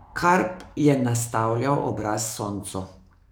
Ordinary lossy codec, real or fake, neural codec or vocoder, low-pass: none; fake; codec, 44.1 kHz, 7.8 kbps, DAC; none